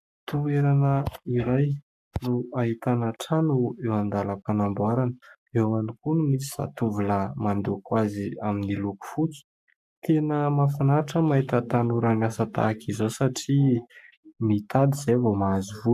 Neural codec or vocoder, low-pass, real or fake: codec, 44.1 kHz, 7.8 kbps, Pupu-Codec; 14.4 kHz; fake